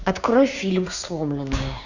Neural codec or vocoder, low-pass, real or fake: codec, 16 kHz, 6 kbps, DAC; 7.2 kHz; fake